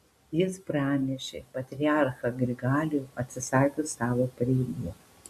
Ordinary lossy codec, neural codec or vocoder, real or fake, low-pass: AAC, 96 kbps; none; real; 14.4 kHz